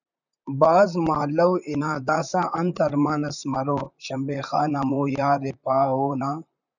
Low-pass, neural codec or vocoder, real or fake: 7.2 kHz; vocoder, 44.1 kHz, 128 mel bands, Pupu-Vocoder; fake